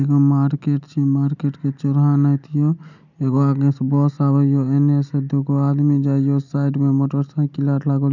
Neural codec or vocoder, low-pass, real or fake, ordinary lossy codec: none; 7.2 kHz; real; none